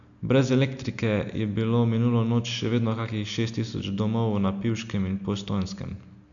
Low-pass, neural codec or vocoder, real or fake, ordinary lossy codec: 7.2 kHz; none; real; none